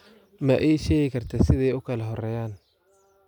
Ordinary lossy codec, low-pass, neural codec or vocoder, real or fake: none; 19.8 kHz; none; real